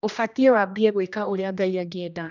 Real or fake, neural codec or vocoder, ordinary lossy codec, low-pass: fake; codec, 16 kHz, 1 kbps, X-Codec, HuBERT features, trained on general audio; none; 7.2 kHz